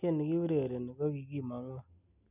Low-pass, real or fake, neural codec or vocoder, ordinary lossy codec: 3.6 kHz; real; none; none